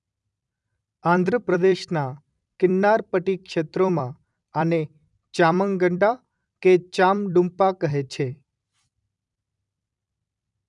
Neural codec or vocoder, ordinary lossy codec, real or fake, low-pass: vocoder, 44.1 kHz, 128 mel bands every 256 samples, BigVGAN v2; none; fake; 10.8 kHz